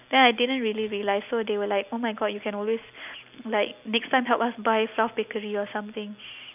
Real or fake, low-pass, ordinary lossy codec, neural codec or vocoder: real; 3.6 kHz; none; none